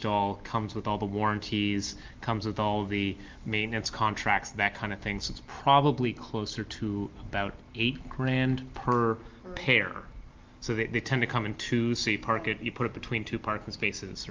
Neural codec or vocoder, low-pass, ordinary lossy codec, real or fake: none; 7.2 kHz; Opus, 32 kbps; real